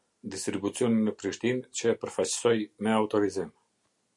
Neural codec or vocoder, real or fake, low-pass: none; real; 10.8 kHz